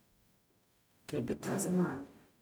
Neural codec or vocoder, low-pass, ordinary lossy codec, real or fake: codec, 44.1 kHz, 0.9 kbps, DAC; none; none; fake